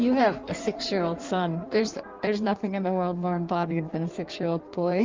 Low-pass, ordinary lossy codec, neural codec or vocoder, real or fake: 7.2 kHz; Opus, 32 kbps; codec, 16 kHz in and 24 kHz out, 1.1 kbps, FireRedTTS-2 codec; fake